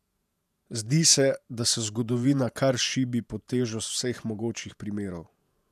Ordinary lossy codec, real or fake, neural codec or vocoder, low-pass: none; fake; vocoder, 48 kHz, 128 mel bands, Vocos; 14.4 kHz